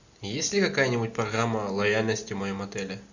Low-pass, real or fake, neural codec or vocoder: 7.2 kHz; real; none